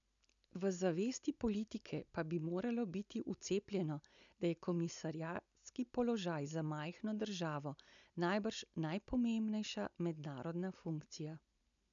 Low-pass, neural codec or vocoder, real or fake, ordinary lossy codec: 7.2 kHz; none; real; none